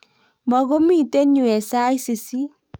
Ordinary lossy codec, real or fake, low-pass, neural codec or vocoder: none; fake; none; codec, 44.1 kHz, 7.8 kbps, DAC